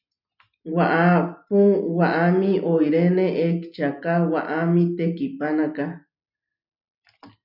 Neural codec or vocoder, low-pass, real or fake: none; 5.4 kHz; real